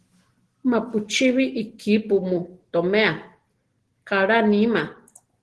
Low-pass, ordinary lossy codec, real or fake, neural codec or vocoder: 10.8 kHz; Opus, 16 kbps; real; none